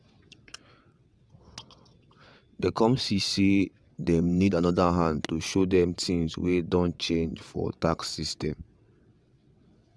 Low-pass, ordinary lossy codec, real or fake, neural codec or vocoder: none; none; fake; vocoder, 22.05 kHz, 80 mel bands, WaveNeXt